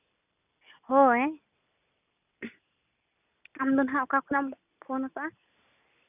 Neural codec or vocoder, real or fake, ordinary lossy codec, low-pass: none; real; none; 3.6 kHz